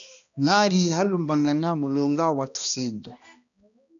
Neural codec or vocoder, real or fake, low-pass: codec, 16 kHz, 1 kbps, X-Codec, HuBERT features, trained on balanced general audio; fake; 7.2 kHz